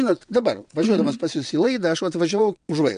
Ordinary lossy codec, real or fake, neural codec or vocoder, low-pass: MP3, 64 kbps; fake; vocoder, 22.05 kHz, 80 mel bands, WaveNeXt; 9.9 kHz